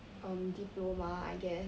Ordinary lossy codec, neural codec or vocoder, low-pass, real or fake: none; none; none; real